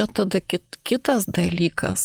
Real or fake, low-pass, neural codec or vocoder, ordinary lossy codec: fake; 19.8 kHz; vocoder, 44.1 kHz, 128 mel bands, Pupu-Vocoder; Opus, 32 kbps